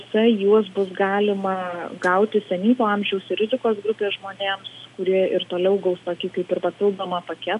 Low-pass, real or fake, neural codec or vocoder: 10.8 kHz; real; none